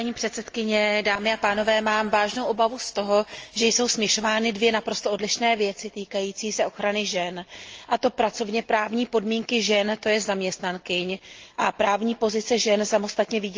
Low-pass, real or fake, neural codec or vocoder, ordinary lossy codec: 7.2 kHz; real; none; Opus, 24 kbps